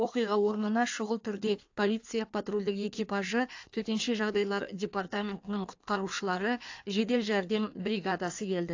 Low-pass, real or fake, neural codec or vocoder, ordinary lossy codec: 7.2 kHz; fake; codec, 16 kHz in and 24 kHz out, 1.1 kbps, FireRedTTS-2 codec; AAC, 48 kbps